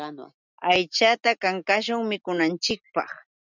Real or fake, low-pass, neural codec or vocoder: real; 7.2 kHz; none